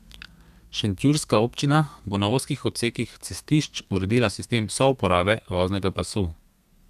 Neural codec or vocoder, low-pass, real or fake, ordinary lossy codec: codec, 32 kHz, 1.9 kbps, SNAC; 14.4 kHz; fake; none